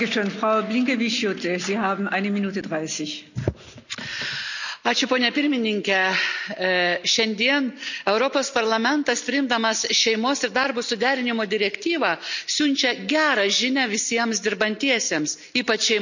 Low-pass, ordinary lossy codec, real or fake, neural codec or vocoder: 7.2 kHz; none; real; none